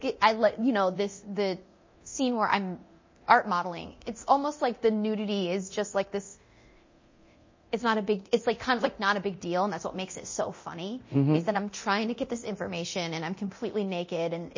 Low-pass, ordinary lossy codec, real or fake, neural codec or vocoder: 7.2 kHz; MP3, 32 kbps; fake; codec, 24 kHz, 0.9 kbps, DualCodec